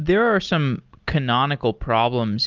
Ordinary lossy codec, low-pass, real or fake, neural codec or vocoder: Opus, 32 kbps; 7.2 kHz; real; none